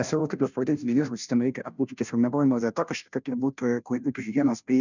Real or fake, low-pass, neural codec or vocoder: fake; 7.2 kHz; codec, 16 kHz, 0.5 kbps, FunCodec, trained on Chinese and English, 25 frames a second